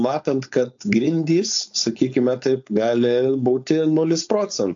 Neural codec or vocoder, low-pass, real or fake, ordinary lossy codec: codec, 16 kHz, 4.8 kbps, FACodec; 7.2 kHz; fake; AAC, 64 kbps